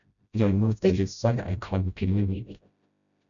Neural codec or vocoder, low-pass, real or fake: codec, 16 kHz, 0.5 kbps, FreqCodec, smaller model; 7.2 kHz; fake